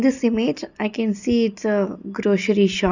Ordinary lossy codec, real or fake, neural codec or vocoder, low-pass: none; real; none; 7.2 kHz